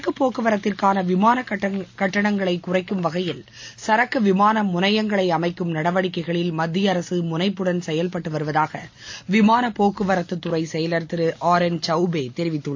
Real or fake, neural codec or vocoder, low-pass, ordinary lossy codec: real; none; 7.2 kHz; AAC, 48 kbps